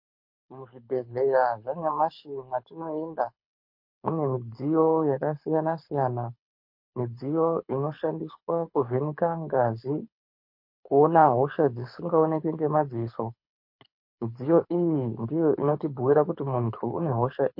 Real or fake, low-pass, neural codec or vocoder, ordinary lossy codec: fake; 5.4 kHz; codec, 24 kHz, 6 kbps, HILCodec; MP3, 32 kbps